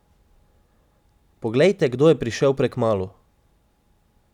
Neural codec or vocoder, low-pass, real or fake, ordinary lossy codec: none; 19.8 kHz; real; none